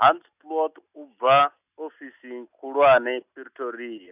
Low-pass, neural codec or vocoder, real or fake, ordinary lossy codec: 3.6 kHz; none; real; none